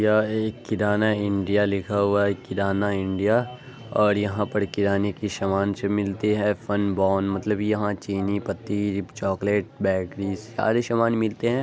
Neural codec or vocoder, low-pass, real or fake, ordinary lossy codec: none; none; real; none